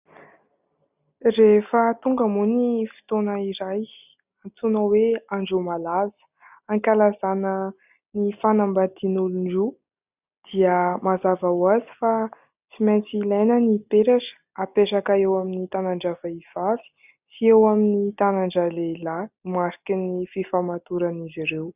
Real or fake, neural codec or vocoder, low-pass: real; none; 3.6 kHz